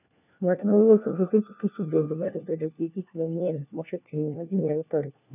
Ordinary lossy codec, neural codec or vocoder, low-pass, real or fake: none; codec, 16 kHz, 1 kbps, FunCodec, trained on LibriTTS, 50 frames a second; 3.6 kHz; fake